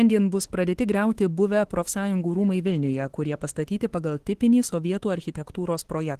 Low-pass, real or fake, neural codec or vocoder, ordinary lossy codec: 14.4 kHz; fake; autoencoder, 48 kHz, 32 numbers a frame, DAC-VAE, trained on Japanese speech; Opus, 16 kbps